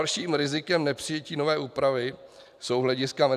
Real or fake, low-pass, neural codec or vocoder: fake; 14.4 kHz; vocoder, 44.1 kHz, 128 mel bands every 512 samples, BigVGAN v2